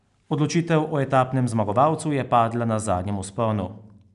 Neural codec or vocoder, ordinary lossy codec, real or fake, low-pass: none; none; real; 10.8 kHz